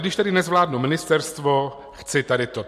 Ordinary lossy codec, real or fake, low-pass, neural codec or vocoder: MP3, 64 kbps; real; 14.4 kHz; none